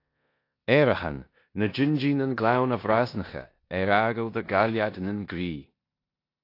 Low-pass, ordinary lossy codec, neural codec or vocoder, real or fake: 5.4 kHz; AAC, 32 kbps; codec, 16 kHz in and 24 kHz out, 0.9 kbps, LongCat-Audio-Codec, four codebook decoder; fake